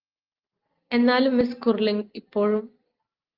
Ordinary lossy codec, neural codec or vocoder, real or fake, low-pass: Opus, 32 kbps; none; real; 5.4 kHz